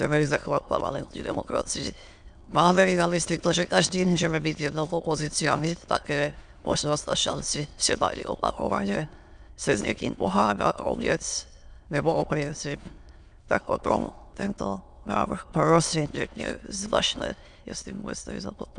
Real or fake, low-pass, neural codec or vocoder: fake; 9.9 kHz; autoencoder, 22.05 kHz, a latent of 192 numbers a frame, VITS, trained on many speakers